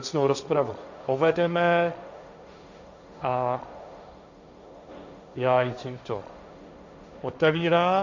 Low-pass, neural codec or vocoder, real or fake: 7.2 kHz; codec, 16 kHz, 1.1 kbps, Voila-Tokenizer; fake